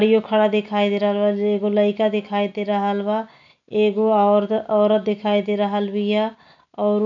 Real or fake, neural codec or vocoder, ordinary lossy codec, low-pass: real; none; none; 7.2 kHz